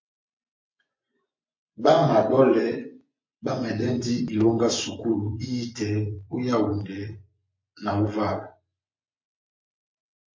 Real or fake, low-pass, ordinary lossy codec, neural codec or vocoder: fake; 7.2 kHz; MP3, 48 kbps; vocoder, 24 kHz, 100 mel bands, Vocos